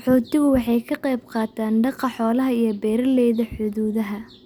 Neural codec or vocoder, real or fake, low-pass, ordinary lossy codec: none; real; 19.8 kHz; none